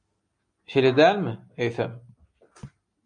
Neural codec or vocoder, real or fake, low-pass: none; real; 9.9 kHz